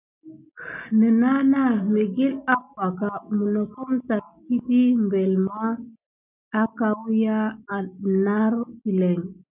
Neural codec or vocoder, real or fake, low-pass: none; real; 3.6 kHz